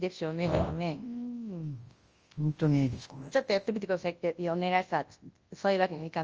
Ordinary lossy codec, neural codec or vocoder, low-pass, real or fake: Opus, 24 kbps; codec, 24 kHz, 0.9 kbps, WavTokenizer, large speech release; 7.2 kHz; fake